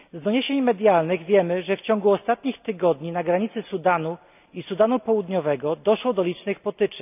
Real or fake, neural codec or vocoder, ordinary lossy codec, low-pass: real; none; none; 3.6 kHz